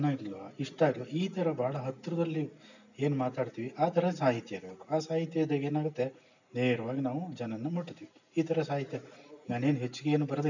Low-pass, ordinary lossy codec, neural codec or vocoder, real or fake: 7.2 kHz; none; none; real